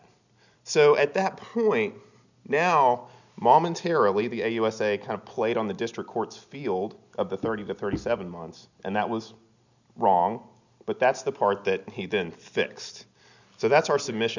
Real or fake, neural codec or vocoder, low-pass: real; none; 7.2 kHz